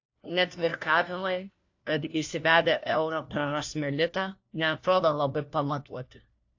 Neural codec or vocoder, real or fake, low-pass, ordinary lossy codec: codec, 16 kHz, 1 kbps, FunCodec, trained on LibriTTS, 50 frames a second; fake; 7.2 kHz; AAC, 48 kbps